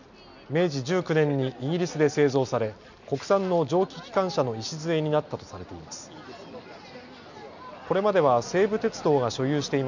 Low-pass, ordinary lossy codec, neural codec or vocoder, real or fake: 7.2 kHz; none; none; real